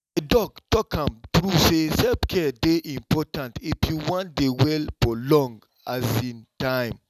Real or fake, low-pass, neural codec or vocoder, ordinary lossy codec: real; 14.4 kHz; none; none